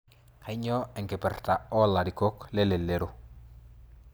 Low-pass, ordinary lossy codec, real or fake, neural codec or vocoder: none; none; real; none